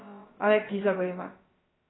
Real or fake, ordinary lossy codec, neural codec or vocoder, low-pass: fake; AAC, 16 kbps; codec, 16 kHz, about 1 kbps, DyCAST, with the encoder's durations; 7.2 kHz